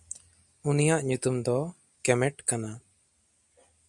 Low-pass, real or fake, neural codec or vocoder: 10.8 kHz; real; none